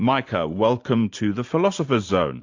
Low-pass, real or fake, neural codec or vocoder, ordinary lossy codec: 7.2 kHz; fake; vocoder, 44.1 kHz, 128 mel bands every 512 samples, BigVGAN v2; AAC, 48 kbps